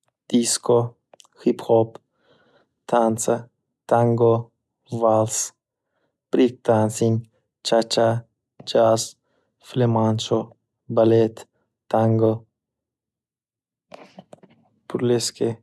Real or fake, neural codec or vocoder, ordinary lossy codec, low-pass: real; none; none; none